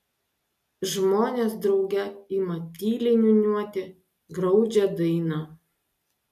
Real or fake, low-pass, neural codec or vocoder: real; 14.4 kHz; none